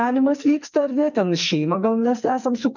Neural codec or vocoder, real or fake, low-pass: codec, 44.1 kHz, 2.6 kbps, SNAC; fake; 7.2 kHz